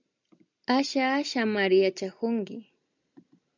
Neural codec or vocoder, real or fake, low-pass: none; real; 7.2 kHz